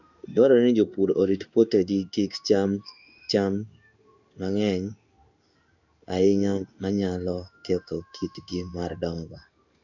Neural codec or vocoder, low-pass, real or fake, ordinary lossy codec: codec, 16 kHz in and 24 kHz out, 1 kbps, XY-Tokenizer; 7.2 kHz; fake; none